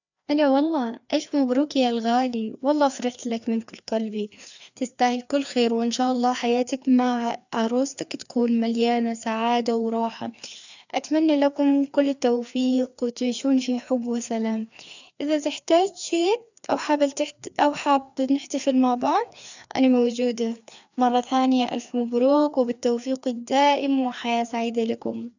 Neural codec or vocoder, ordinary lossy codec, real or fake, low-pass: codec, 16 kHz, 2 kbps, FreqCodec, larger model; none; fake; 7.2 kHz